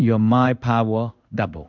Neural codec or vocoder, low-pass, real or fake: codec, 16 kHz in and 24 kHz out, 1 kbps, XY-Tokenizer; 7.2 kHz; fake